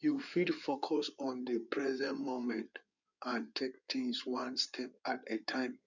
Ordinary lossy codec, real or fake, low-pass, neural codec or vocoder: none; fake; 7.2 kHz; codec, 16 kHz in and 24 kHz out, 2.2 kbps, FireRedTTS-2 codec